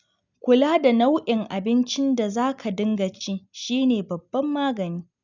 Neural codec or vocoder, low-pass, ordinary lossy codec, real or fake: none; 7.2 kHz; none; real